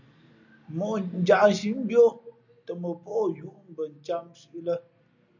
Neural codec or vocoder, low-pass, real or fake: none; 7.2 kHz; real